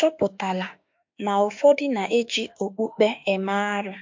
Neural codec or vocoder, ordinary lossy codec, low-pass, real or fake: autoencoder, 48 kHz, 32 numbers a frame, DAC-VAE, trained on Japanese speech; MP3, 48 kbps; 7.2 kHz; fake